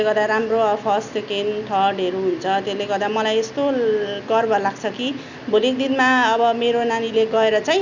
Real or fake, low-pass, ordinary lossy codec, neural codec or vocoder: real; 7.2 kHz; none; none